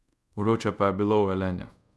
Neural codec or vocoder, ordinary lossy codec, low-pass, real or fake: codec, 24 kHz, 0.5 kbps, DualCodec; none; none; fake